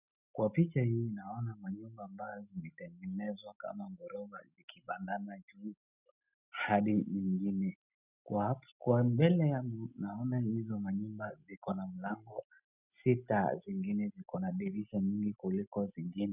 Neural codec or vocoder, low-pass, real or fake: none; 3.6 kHz; real